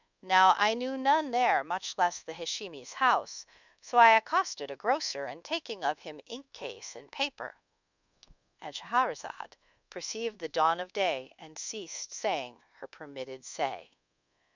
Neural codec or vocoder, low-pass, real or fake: codec, 24 kHz, 1.2 kbps, DualCodec; 7.2 kHz; fake